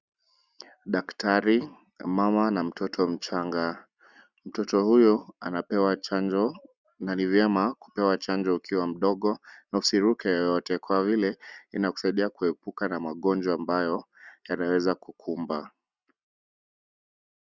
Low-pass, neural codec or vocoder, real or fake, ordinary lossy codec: 7.2 kHz; none; real; Opus, 64 kbps